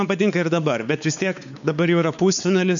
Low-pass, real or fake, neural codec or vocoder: 7.2 kHz; fake; codec, 16 kHz, 4 kbps, X-Codec, WavLM features, trained on Multilingual LibriSpeech